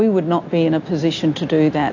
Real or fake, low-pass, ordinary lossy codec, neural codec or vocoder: real; 7.2 kHz; AAC, 48 kbps; none